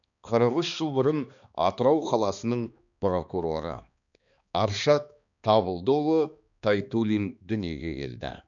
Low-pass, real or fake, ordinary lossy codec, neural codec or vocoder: 7.2 kHz; fake; none; codec, 16 kHz, 2 kbps, X-Codec, HuBERT features, trained on balanced general audio